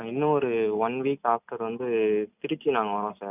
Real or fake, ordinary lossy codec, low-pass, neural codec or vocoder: real; none; 3.6 kHz; none